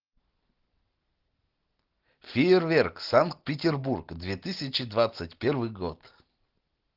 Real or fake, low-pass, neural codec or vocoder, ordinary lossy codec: real; 5.4 kHz; none; Opus, 16 kbps